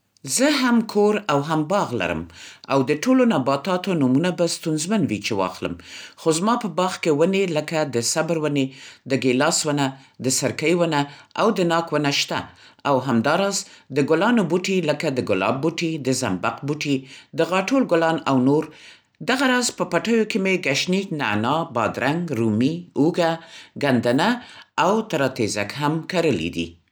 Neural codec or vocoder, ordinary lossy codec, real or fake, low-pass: none; none; real; none